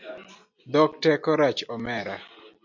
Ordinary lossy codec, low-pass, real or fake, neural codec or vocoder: MP3, 64 kbps; 7.2 kHz; real; none